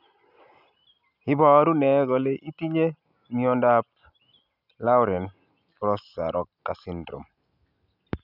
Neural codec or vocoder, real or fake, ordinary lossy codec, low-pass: none; real; none; 5.4 kHz